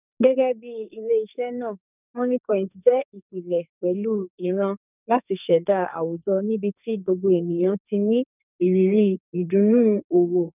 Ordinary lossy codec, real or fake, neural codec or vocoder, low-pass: none; fake; codec, 44.1 kHz, 2.6 kbps, SNAC; 3.6 kHz